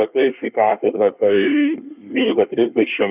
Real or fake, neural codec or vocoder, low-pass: fake; codec, 24 kHz, 1 kbps, SNAC; 3.6 kHz